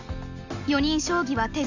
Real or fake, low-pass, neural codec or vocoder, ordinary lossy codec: real; 7.2 kHz; none; none